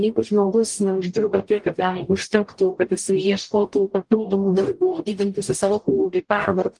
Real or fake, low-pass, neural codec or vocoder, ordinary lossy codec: fake; 10.8 kHz; codec, 44.1 kHz, 0.9 kbps, DAC; Opus, 24 kbps